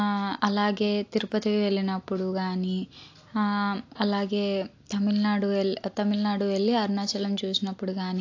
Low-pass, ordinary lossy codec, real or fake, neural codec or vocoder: 7.2 kHz; AAC, 48 kbps; real; none